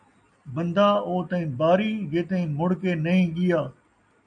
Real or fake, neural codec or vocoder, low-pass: real; none; 9.9 kHz